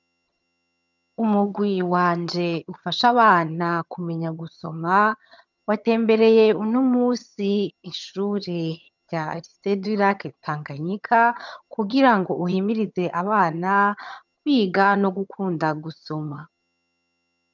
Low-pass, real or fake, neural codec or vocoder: 7.2 kHz; fake; vocoder, 22.05 kHz, 80 mel bands, HiFi-GAN